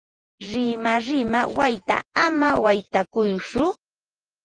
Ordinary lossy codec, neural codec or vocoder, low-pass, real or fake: Opus, 24 kbps; vocoder, 48 kHz, 128 mel bands, Vocos; 9.9 kHz; fake